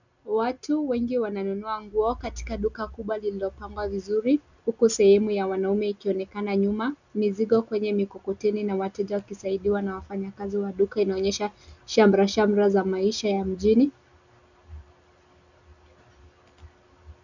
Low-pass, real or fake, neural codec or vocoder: 7.2 kHz; real; none